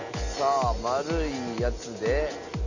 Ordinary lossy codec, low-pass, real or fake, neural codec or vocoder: none; 7.2 kHz; real; none